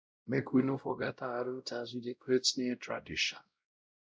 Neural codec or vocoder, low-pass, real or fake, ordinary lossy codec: codec, 16 kHz, 0.5 kbps, X-Codec, WavLM features, trained on Multilingual LibriSpeech; none; fake; none